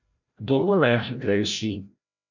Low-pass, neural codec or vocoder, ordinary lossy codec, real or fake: 7.2 kHz; codec, 16 kHz, 0.5 kbps, FreqCodec, larger model; none; fake